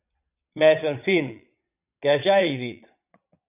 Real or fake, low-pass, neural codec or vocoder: fake; 3.6 kHz; vocoder, 22.05 kHz, 80 mel bands, Vocos